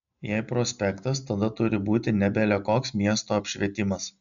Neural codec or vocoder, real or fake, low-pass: none; real; 7.2 kHz